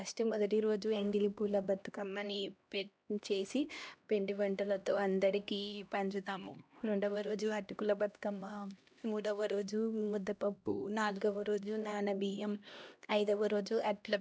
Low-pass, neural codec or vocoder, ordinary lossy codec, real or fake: none; codec, 16 kHz, 1 kbps, X-Codec, HuBERT features, trained on LibriSpeech; none; fake